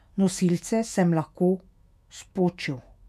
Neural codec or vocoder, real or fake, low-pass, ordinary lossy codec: autoencoder, 48 kHz, 128 numbers a frame, DAC-VAE, trained on Japanese speech; fake; 14.4 kHz; none